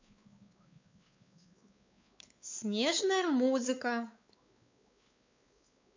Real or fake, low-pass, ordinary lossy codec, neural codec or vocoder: fake; 7.2 kHz; MP3, 64 kbps; codec, 16 kHz, 4 kbps, X-Codec, WavLM features, trained on Multilingual LibriSpeech